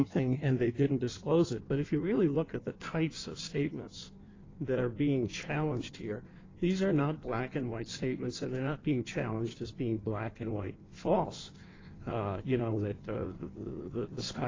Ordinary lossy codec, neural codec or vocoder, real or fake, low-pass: AAC, 32 kbps; codec, 16 kHz in and 24 kHz out, 1.1 kbps, FireRedTTS-2 codec; fake; 7.2 kHz